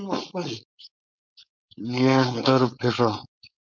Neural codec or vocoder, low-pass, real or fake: codec, 16 kHz, 4.8 kbps, FACodec; 7.2 kHz; fake